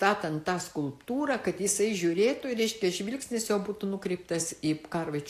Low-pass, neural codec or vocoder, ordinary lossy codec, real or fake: 14.4 kHz; none; AAC, 64 kbps; real